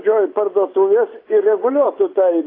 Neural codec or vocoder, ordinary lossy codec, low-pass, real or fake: vocoder, 24 kHz, 100 mel bands, Vocos; AAC, 24 kbps; 5.4 kHz; fake